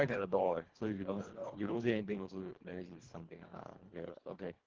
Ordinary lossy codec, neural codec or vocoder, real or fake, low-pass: Opus, 16 kbps; codec, 24 kHz, 1.5 kbps, HILCodec; fake; 7.2 kHz